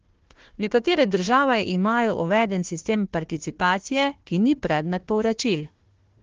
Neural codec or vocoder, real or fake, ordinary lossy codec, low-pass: codec, 16 kHz, 1 kbps, FunCodec, trained on Chinese and English, 50 frames a second; fake; Opus, 16 kbps; 7.2 kHz